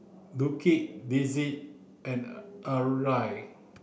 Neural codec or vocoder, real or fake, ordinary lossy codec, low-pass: none; real; none; none